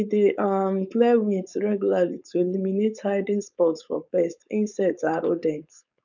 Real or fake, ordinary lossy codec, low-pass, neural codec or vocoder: fake; none; 7.2 kHz; codec, 16 kHz, 4.8 kbps, FACodec